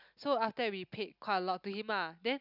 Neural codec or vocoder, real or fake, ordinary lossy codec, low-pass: none; real; none; 5.4 kHz